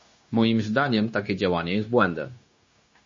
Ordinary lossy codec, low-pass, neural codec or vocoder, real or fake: MP3, 32 kbps; 7.2 kHz; codec, 16 kHz, 0.9 kbps, LongCat-Audio-Codec; fake